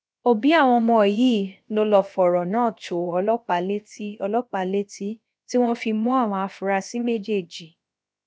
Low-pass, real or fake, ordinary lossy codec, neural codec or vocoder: none; fake; none; codec, 16 kHz, about 1 kbps, DyCAST, with the encoder's durations